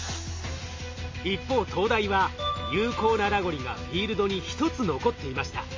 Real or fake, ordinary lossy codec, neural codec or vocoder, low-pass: real; MP3, 32 kbps; none; 7.2 kHz